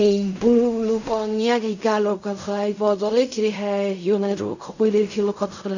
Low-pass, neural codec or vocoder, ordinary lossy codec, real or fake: 7.2 kHz; codec, 16 kHz in and 24 kHz out, 0.4 kbps, LongCat-Audio-Codec, fine tuned four codebook decoder; none; fake